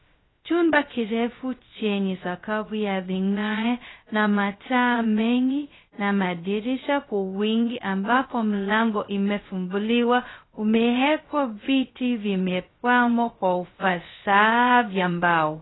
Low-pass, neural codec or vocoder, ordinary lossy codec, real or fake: 7.2 kHz; codec, 16 kHz, 0.2 kbps, FocalCodec; AAC, 16 kbps; fake